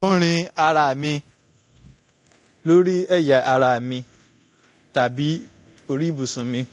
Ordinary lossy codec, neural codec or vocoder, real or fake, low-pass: AAC, 48 kbps; codec, 24 kHz, 0.9 kbps, DualCodec; fake; 10.8 kHz